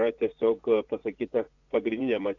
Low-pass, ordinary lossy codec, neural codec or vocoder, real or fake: 7.2 kHz; AAC, 48 kbps; vocoder, 24 kHz, 100 mel bands, Vocos; fake